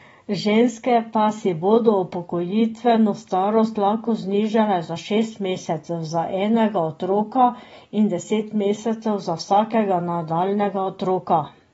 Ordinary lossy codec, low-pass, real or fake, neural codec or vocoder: AAC, 24 kbps; 19.8 kHz; real; none